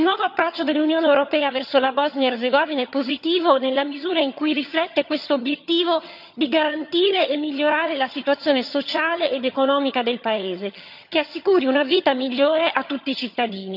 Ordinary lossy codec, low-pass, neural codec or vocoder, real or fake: none; 5.4 kHz; vocoder, 22.05 kHz, 80 mel bands, HiFi-GAN; fake